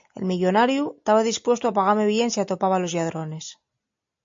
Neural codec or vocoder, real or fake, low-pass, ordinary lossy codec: none; real; 7.2 kHz; MP3, 48 kbps